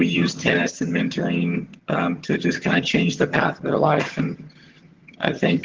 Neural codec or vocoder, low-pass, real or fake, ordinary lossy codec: vocoder, 22.05 kHz, 80 mel bands, HiFi-GAN; 7.2 kHz; fake; Opus, 16 kbps